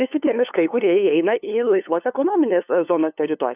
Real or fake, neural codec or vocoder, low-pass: fake; codec, 16 kHz, 2 kbps, FunCodec, trained on LibriTTS, 25 frames a second; 3.6 kHz